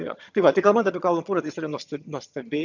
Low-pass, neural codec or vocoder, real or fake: 7.2 kHz; vocoder, 22.05 kHz, 80 mel bands, HiFi-GAN; fake